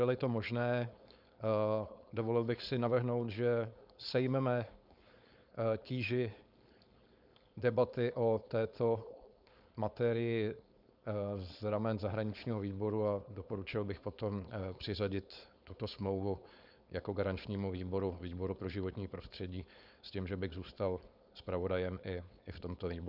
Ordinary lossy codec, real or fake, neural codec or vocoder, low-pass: Opus, 64 kbps; fake; codec, 16 kHz, 4.8 kbps, FACodec; 5.4 kHz